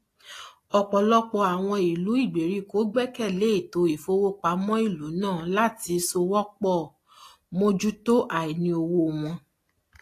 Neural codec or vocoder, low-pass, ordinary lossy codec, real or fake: none; 14.4 kHz; AAC, 48 kbps; real